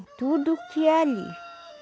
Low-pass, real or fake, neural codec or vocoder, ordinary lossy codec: none; real; none; none